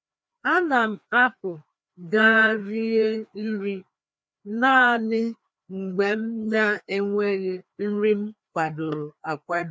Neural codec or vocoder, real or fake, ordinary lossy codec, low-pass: codec, 16 kHz, 2 kbps, FreqCodec, larger model; fake; none; none